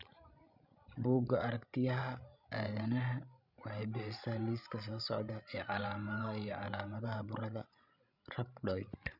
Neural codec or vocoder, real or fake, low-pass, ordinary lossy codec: codec, 16 kHz, 16 kbps, FreqCodec, larger model; fake; 5.4 kHz; none